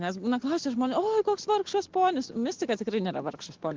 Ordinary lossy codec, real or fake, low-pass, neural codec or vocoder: Opus, 16 kbps; real; 7.2 kHz; none